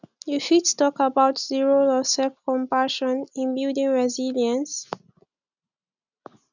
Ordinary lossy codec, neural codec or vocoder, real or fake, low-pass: none; none; real; 7.2 kHz